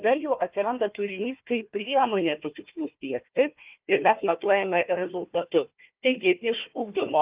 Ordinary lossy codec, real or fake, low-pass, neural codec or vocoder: Opus, 24 kbps; fake; 3.6 kHz; codec, 16 kHz, 1 kbps, FunCodec, trained on Chinese and English, 50 frames a second